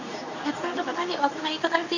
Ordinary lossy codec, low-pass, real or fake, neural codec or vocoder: none; 7.2 kHz; fake; codec, 24 kHz, 0.9 kbps, WavTokenizer, medium speech release version 2